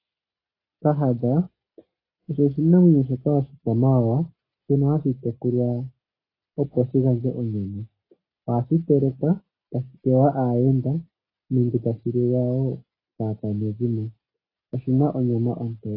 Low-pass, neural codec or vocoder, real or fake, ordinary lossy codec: 5.4 kHz; none; real; AAC, 24 kbps